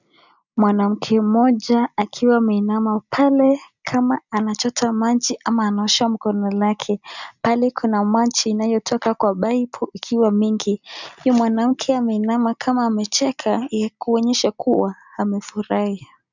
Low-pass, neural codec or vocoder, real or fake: 7.2 kHz; none; real